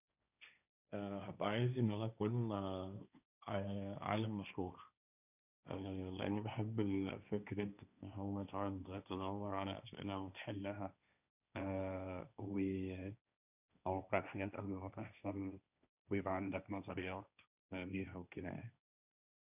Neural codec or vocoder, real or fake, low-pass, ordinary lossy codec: codec, 16 kHz, 1.1 kbps, Voila-Tokenizer; fake; 3.6 kHz; none